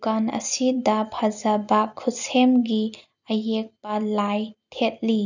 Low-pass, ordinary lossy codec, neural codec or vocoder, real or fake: 7.2 kHz; none; none; real